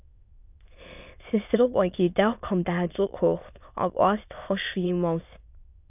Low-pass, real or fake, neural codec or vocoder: 3.6 kHz; fake; autoencoder, 22.05 kHz, a latent of 192 numbers a frame, VITS, trained on many speakers